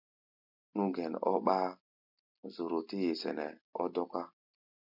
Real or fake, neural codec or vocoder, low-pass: real; none; 5.4 kHz